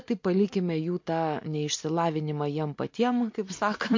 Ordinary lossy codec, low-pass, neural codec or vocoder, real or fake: MP3, 48 kbps; 7.2 kHz; none; real